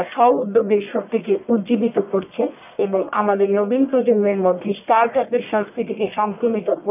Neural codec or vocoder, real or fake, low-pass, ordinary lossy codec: codec, 44.1 kHz, 1.7 kbps, Pupu-Codec; fake; 3.6 kHz; none